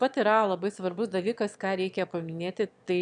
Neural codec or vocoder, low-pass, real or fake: autoencoder, 22.05 kHz, a latent of 192 numbers a frame, VITS, trained on one speaker; 9.9 kHz; fake